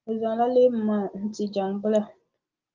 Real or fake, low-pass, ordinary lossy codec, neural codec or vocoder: real; 7.2 kHz; Opus, 24 kbps; none